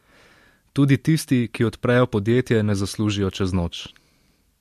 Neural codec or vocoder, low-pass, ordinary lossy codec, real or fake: none; 14.4 kHz; MP3, 64 kbps; real